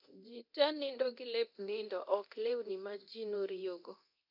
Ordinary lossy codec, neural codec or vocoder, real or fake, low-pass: none; codec, 24 kHz, 0.9 kbps, DualCodec; fake; 5.4 kHz